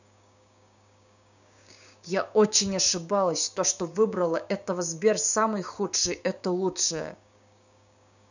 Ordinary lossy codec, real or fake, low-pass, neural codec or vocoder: none; fake; 7.2 kHz; codec, 16 kHz, 6 kbps, DAC